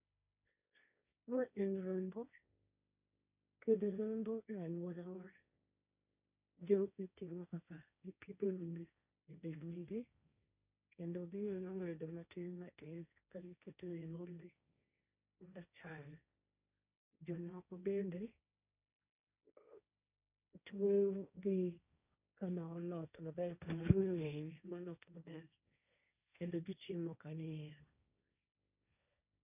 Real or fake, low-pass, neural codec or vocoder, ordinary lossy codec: fake; 3.6 kHz; codec, 16 kHz, 1.1 kbps, Voila-Tokenizer; none